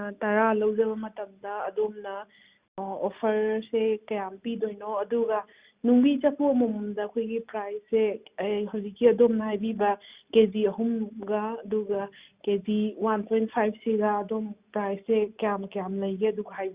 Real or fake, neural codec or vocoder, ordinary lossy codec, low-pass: real; none; Opus, 64 kbps; 3.6 kHz